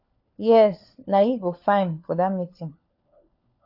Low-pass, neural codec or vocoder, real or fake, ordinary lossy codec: 5.4 kHz; codec, 16 kHz, 4 kbps, FunCodec, trained on LibriTTS, 50 frames a second; fake; AAC, 48 kbps